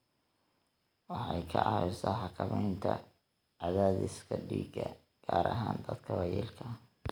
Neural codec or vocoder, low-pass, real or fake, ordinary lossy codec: none; none; real; none